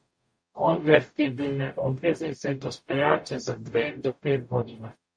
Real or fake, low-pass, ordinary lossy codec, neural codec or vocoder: fake; 9.9 kHz; MP3, 48 kbps; codec, 44.1 kHz, 0.9 kbps, DAC